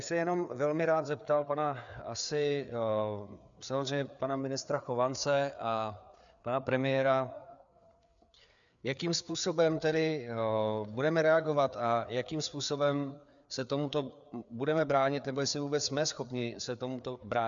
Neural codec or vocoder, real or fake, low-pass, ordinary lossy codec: codec, 16 kHz, 4 kbps, FreqCodec, larger model; fake; 7.2 kHz; MP3, 96 kbps